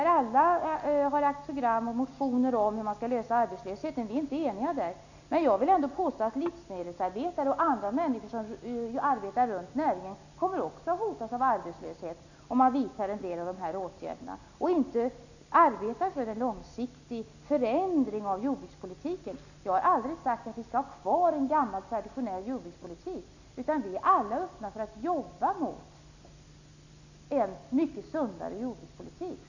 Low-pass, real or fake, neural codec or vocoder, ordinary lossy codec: 7.2 kHz; real; none; none